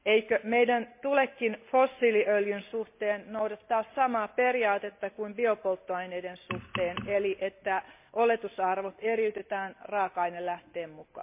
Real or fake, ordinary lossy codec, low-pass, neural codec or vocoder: real; MP3, 32 kbps; 3.6 kHz; none